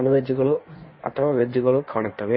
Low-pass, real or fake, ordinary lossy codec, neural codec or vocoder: 7.2 kHz; fake; MP3, 24 kbps; codec, 16 kHz in and 24 kHz out, 1.1 kbps, FireRedTTS-2 codec